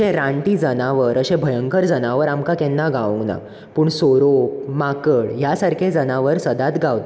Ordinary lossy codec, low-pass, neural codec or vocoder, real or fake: none; none; none; real